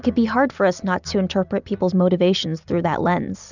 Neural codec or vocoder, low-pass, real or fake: none; 7.2 kHz; real